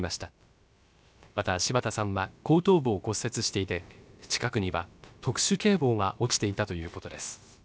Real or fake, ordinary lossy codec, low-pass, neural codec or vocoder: fake; none; none; codec, 16 kHz, about 1 kbps, DyCAST, with the encoder's durations